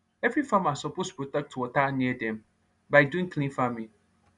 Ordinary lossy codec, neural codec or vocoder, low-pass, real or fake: none; none; 10.8 kHz; real